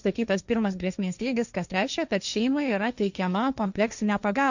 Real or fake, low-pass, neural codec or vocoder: fake; 7.2 kHz; codec, 16 kHz, 1.1 kbps, Voila-Tokenizer